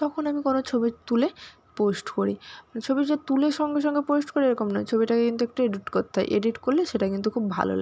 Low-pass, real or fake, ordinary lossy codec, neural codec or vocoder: none; real; none; none